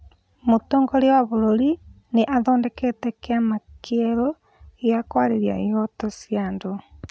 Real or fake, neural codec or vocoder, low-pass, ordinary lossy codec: real; none; none; none